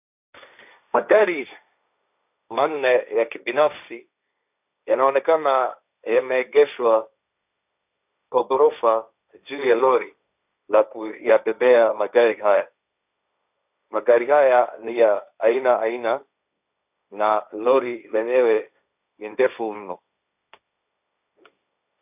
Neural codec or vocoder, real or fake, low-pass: codec, 16 kHz, 1.1 kbps, Voila-Tokenizer; fake; 3.6 kHz